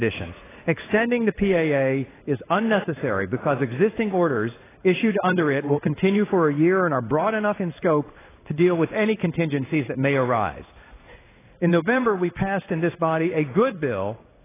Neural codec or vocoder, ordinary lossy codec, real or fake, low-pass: codec, 16 kHz in and 24 kHz out, 1 kbps, XY-Tokenizer; AAC, 16 kbps; fake; 3.6 kHz